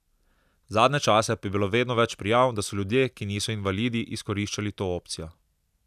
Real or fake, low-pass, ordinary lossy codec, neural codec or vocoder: real; 14.4 kHz; none; none